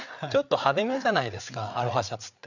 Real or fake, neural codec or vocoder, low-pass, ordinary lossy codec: fake; codec, 16 kHz, 8 kbps, FreqCodec, larger model; 7.2 kHz; none